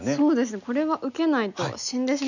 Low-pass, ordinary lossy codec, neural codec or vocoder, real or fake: 7.2 kHz; none; none; real